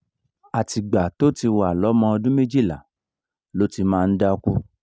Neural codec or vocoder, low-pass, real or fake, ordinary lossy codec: none; none; real; none